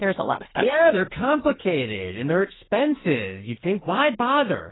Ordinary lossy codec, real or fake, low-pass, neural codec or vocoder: AAC, 16 kbps; fake; 7.2 kHz; codec, 44.1 kHz, 2.6 kbps, SNAC